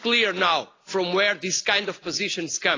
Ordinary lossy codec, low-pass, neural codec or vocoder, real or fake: AAC, 32 kbps; 7.2 kHz; none; real